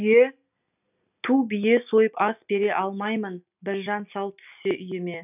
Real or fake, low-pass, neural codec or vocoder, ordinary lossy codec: real; 3.6 kHz; none; none